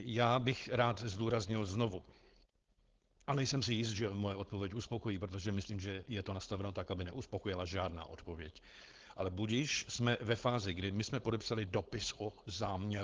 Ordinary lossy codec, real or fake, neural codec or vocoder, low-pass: Opus, 16 kbps; fake; codec, 16 kHz, 4.8 kbps, FACodec; 7.2 kHz